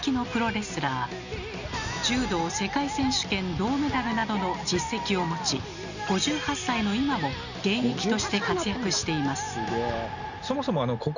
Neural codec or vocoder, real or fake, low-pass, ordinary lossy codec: none; real; 7.2 kHz; none